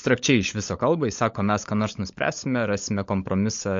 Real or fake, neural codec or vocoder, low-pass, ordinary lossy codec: fake; codec, 16 kHz, 16 kbps, FunCodec, trained on LibriTTS, 50 frames a second; 7.2 kHz; MP3, 48 kbps